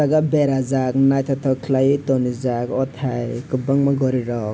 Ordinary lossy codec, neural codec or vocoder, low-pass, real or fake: none; none; none; real